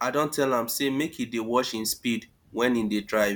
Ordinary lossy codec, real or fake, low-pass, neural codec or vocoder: none; real; none; none